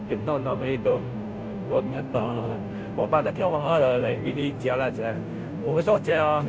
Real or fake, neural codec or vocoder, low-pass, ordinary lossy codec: fake; codec, 16 kHz, 0.5 kbps, FunCodec, trained on Chinese and English, 25 frames a second; none; none